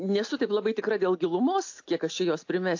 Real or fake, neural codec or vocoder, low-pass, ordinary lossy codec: real; none; 7.2 kHz; AAC, 48 kbps